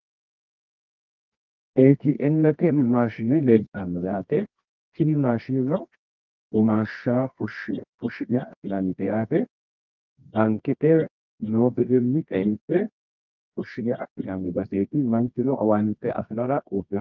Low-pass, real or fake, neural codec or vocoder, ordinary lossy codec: 7.2 kHz; fake; codec, 24 kHz, 0.9 kbps, WavTokenizer, medium music audio release; Opus, 32 kbps